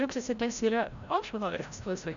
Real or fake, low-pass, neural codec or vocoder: fake; 7.2 kHz; codec, 16 kHz, 0.5 kbps, FreqCodec, larger model